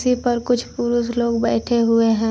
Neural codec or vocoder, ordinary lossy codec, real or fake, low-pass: none; none; real; none